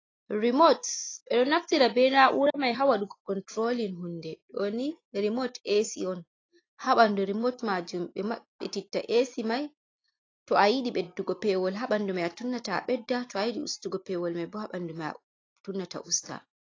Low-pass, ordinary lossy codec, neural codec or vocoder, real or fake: 7.2 kHz; AAC, 32 kbps; none; real